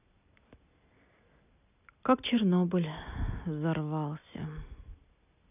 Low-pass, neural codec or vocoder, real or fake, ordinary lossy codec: 3.6 kHz; none; real; none